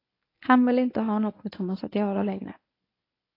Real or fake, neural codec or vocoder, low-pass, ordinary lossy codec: fake; codec, 24 kHz, 0.9 kbps, WavTokenizer, medium speech release version 1; 5.4 kHz; AAC, 32 kbps